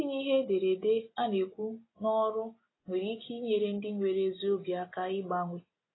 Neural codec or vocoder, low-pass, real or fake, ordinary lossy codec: none; 7.2 kHz; real; AAC, 16 kbps